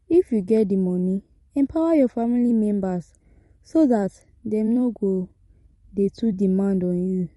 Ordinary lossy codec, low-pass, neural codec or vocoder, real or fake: MP3, 48 kbps; 19.8 kHz; vocoder, 44.1 kHz, 128 mel bands every 256 samples, BigVGAN v2; fake